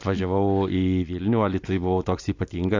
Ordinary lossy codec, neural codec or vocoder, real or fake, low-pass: AAC, 48 kbps; none; real; 7.2 kHz